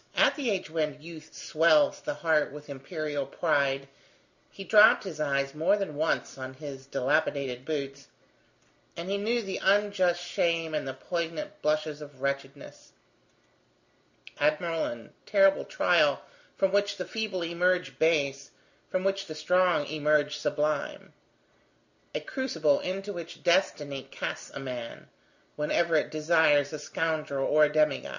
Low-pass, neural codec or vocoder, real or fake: 7.2 kHz; none; real